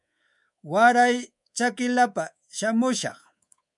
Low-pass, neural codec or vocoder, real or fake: 10.8 kHz; codec, 24 kHz, 3.1 kbps, DualCodec; fake